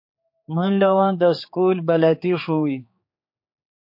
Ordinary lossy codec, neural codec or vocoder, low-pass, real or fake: MP3, 32 kbps; codec, 16 kHz, 4 kbps, X-Codec, HuBERT features, trained on general audio; 5.4 kHz; fake